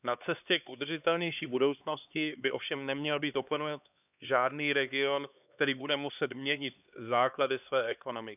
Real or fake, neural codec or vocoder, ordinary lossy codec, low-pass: fake; codec, 16 kHz, 2 kbps, X-Codec, HuBERT features, trained on LibriSpeech; none; 3.6 kHz